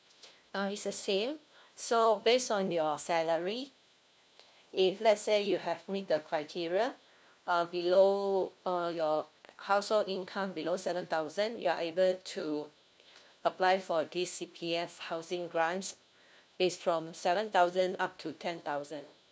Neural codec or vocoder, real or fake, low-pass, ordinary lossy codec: codec, 16 kHz, 1 kbps, FunCodec, trained on LibriTTS, 50 frames a second; fake; none; none